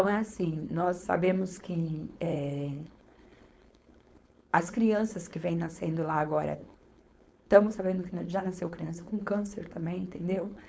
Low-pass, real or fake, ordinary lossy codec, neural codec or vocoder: none; fake; none; codec, 16 kHz, 4.8 kbps, FACodec